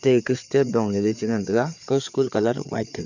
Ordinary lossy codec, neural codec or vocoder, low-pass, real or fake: none; codec, 16 kHz, 4 kbps, FreqCodec, larger model; 7.2 kHz; fake